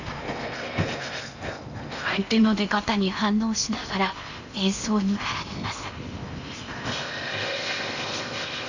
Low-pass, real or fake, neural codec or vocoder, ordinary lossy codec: 7.2 kHz; fake; codec, 16 kHz in and 24 kHz out, 0.8 kbps, FocalCodec, streaming, 65536 codes; none